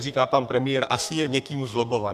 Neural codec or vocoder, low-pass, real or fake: codec, 32 kHz, 1.9 kbps, SNAC; 14.4 kHz; fake